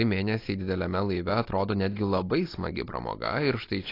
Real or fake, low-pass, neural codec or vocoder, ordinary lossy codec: real; 5.4 kHz; none; AAC, 32 kbps